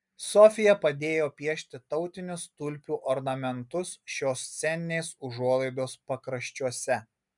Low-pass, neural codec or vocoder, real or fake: 10.8 kHz; none; real